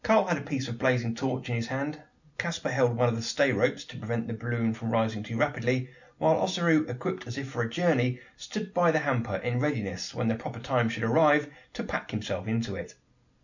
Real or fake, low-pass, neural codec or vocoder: real; 7.2 kHz; none